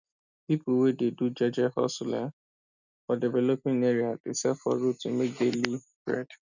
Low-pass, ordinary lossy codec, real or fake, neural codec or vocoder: 7.2 kHz; none; real; none